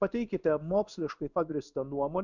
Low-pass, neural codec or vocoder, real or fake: 7.2 kHz; codec, 16 kHz in and 24 kHz out, 1 kbps, XY-Tokenizer; fake